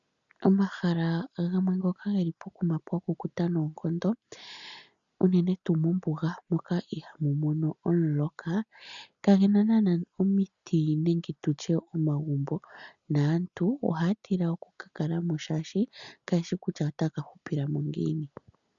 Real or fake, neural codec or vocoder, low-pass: real; none; 7.2 kHz